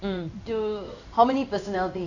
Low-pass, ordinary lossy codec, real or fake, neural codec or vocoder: 7.2 kHz; none; fake; codec, 16 kHz in and 24 kHz out, 1 kbps, XY-Tokenizer